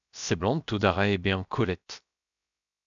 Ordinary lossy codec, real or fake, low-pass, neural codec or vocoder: MP3, 96 kbps; fake; 7.2 kHz; codec, 16 kHz, 0.7 kbps, FocalCodec